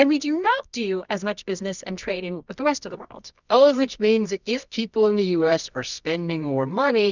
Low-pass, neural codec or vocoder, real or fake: 7.2 kHz; codec, 24 kHz, 0.9 kbps, WavTokenizer, medium music audio release; fake